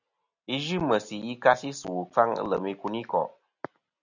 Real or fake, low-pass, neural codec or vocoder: real; 7.2 kHz; none